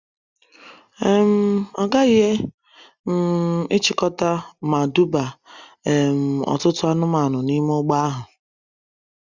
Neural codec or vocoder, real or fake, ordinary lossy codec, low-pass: none; real; Opus, 64 kbps; 7.2 kHz